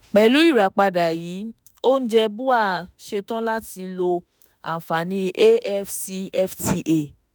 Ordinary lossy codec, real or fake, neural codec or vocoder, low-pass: none; fake; autoencoder, 48 kHz, 32 numbers a frame, DAC-VAE, trained on Japanese speech; none